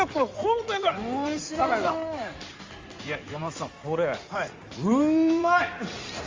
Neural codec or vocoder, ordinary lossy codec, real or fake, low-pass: codec, 16 kHz in and 24 kHz out, 2.2 kbps, FireRedTTS-2 codec; Opus, 32 kbps; fake; 7.2 kHz